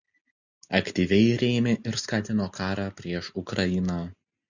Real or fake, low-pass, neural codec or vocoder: real; 7.2 kHz; none